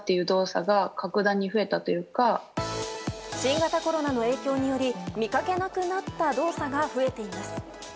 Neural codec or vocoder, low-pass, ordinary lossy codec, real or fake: none; none; none; real